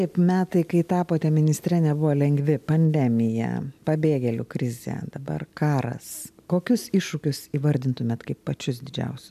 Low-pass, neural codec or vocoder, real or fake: 14.4 kHz; none; real